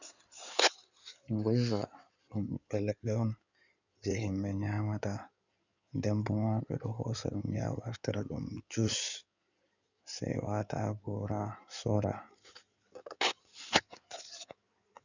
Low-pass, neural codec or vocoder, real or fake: 7.2 kHz; codec, 16 kHz in and 24 kHz out, 2.2 kbps, FireRedTTS-2 codec; fake